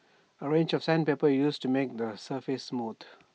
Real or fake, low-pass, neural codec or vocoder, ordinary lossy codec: real; none; none; none